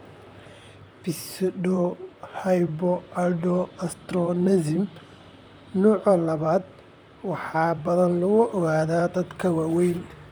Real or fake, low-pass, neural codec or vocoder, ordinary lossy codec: fake; none; vocoder, 44.1 kHz, 128 mel bands, Pupu-Vocoder; none